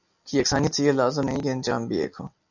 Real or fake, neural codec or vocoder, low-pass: real; none; 7.2 kHz